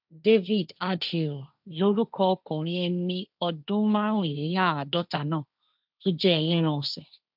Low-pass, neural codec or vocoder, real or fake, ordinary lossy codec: 5.4 kHz; codec, 16 kHz, 1.1 kbps, Voila-Tokenizer; fake; none